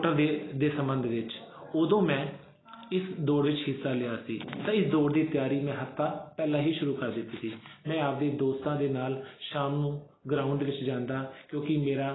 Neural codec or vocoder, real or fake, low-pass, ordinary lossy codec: none; real; 7.2 kHz; AAC, 16 kbps